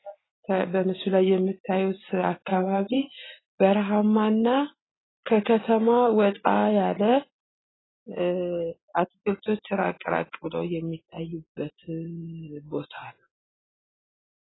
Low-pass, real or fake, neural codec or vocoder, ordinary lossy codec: 7.2 kHz; real; none; AAC, 16 kbps